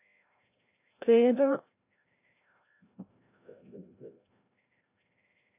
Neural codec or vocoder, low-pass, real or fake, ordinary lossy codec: codec, 16 kHz, 0.5 kbps, FreqCodec, larger model; 3.6 kHz; fake; AAC, 24 kbps